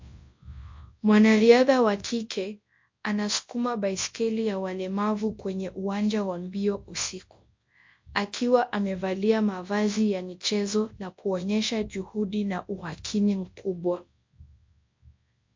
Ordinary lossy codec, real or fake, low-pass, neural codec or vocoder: MP3, 48 kbps; fake; 7.2 kHz; codec, 24 kHz, 0.9 kbps, WavTokenizer, large speech release